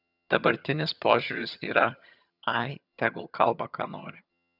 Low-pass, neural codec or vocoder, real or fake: 5.4 kHz; vocoder, 22.05 kHz, 80 mel bands, HiFi-GAN; fake